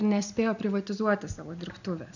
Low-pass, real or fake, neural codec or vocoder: 7.2 kHz; real; none